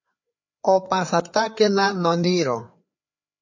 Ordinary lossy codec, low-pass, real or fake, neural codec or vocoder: MP3, 48 kbps; 7.2 kHz; fake; codec, 16 kHz, 4 kbps, FreqCodec, larger model